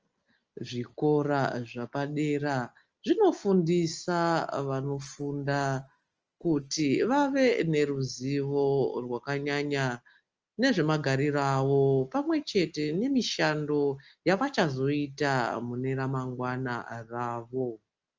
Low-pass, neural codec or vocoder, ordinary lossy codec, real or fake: 7.2 kHz; none; Opus, 24 kbps; real